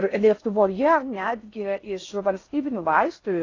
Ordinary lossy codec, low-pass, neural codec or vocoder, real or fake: AAC, 32 kbps; 7.2 kHz; codec, 16 kHz in and 24 kHz out, 0.6 kbps, FocalCodec, streaming, 4096 codes; fake